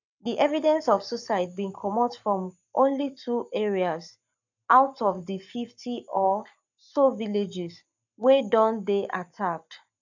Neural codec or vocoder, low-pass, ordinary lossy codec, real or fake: codec, 44.1 kHz, 7.8 kbps, Pupu-Codec; 7.2 kHz; none; fake